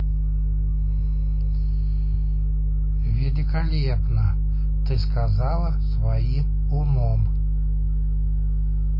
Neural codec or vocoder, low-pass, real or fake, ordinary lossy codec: none; 5.4 kHz; real; MP3, 24 kbps